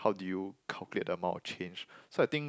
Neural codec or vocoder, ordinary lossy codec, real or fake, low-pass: none; none; real; none